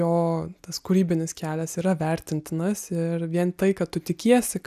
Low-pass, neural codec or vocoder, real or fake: 14.4 kHz; none; real